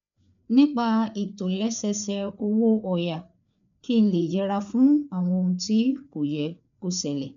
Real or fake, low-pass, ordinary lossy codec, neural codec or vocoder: fake; 7.2 kHz; none; codec, 16 kHz, 4 kbps, FreqCodec, larger model